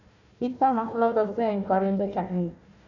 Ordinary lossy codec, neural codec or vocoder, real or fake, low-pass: none; codec, 16 kHz, 1 kbps, FunCodec, trained on Chinese and English, 50 frames a second; fake; 7.2 kHz